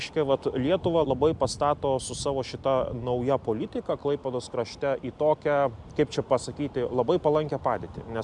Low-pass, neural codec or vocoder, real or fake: 10.8 kHz; none; real